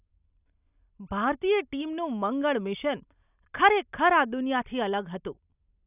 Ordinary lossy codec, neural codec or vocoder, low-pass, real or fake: none; none; 3.6 kHz; real